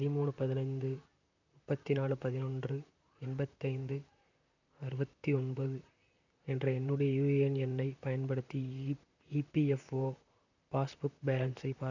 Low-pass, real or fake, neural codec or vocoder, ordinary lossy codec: 7.2 kHz; fake; vocoder, 44.1 kHz, 128 mel bands, Pupu-Vocoder; none